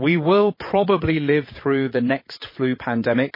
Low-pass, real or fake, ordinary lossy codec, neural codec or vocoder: 5.4 kHz; fake; MP3, 24 kbps; vocoder, 22.05 kHz, 80 mel bands, WaveNeXt